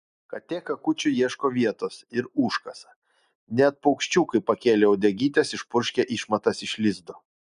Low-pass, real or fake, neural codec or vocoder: 10.8 kHz; real; none